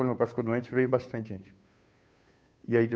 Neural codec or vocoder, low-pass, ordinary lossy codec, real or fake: codec, 16 kHz, 2 kbps, FunCodec, trained on Chinese and English, 25 frames a second; none; none; fake